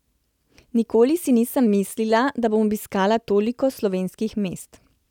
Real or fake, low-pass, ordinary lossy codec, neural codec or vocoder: real; 19.8 kHz; none; none